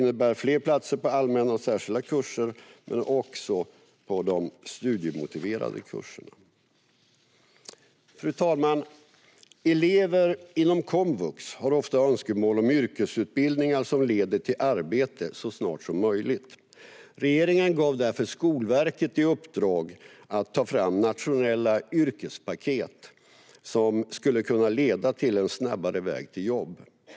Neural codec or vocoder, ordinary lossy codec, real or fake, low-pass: none; none; real; none